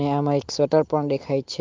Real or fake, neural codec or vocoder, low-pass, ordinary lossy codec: real; none; 7.2 kHz; Opus, 24 kbps